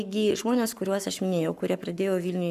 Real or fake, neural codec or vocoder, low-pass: fake; codec, 44.1 kHz, 7.8 kbps, Pupu-Codec; 14.4 kHz